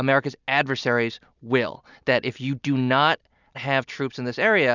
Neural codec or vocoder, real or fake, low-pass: none; real; 7.2 kHz